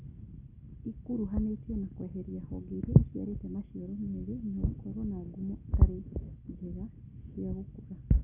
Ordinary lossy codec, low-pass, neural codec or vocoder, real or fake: none; 3.6 kHz; none; real